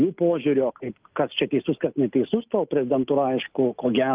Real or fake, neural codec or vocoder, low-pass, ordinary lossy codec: real; none; 3.6 kHz; Opus, 24 kbps